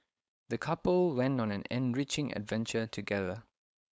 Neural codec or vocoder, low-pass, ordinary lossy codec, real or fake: codec, 16 kHz, 4.8 kbps, FACodec; none; none; fake